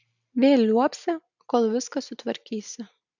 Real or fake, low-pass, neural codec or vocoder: real; 7.2 kHz; none